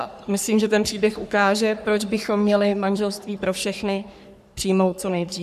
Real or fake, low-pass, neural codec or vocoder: fake; 14.4 kHz; codec, 44.1 kHz, 3.4 kbps, Pupu-Codec